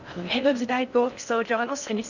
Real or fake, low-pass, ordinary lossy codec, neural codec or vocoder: fake; 7.2 kHz; none; codec, 16 kHz in and 24 kHz out, 0.6 kbps, FocalCodec, streaming, 4096 codes